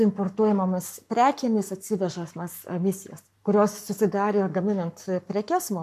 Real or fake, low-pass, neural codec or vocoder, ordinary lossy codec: fake; 14.4 kHz; codec, 44.1 kHz, 7.8 kbps, Pupu-Codec; MP3, 96 kbps